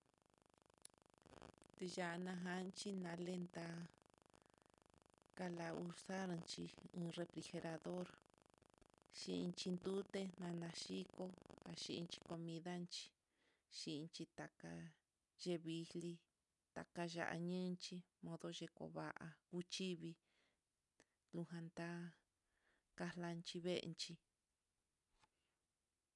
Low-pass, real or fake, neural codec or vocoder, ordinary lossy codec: 10.8 kHz; real; none; none